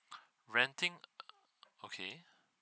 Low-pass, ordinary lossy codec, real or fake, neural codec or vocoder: none; none; real; none